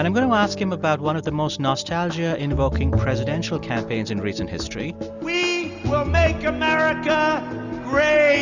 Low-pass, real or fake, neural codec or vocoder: 7.2 kHz; real; none